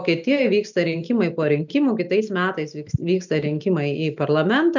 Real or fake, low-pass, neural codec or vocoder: real; 7.2 kHz; none